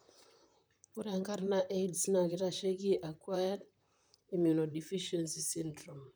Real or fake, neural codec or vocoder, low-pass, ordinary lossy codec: fake; vocoder, 44.1 kHz, 128 mel bands, Pupu-Vocoder; none; none